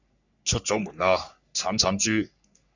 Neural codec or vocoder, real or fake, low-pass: codec, 44.1 kHz, 3.4 kbps, Pupu-Codec; fake; 7.2 kHz